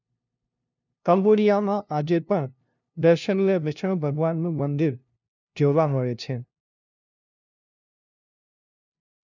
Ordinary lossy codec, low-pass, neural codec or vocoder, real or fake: none; 7.2 kHz; codec, 16 kHz, 0.5 kbps, FunCodec, trained on LibriTTS, 25 frames a second; fake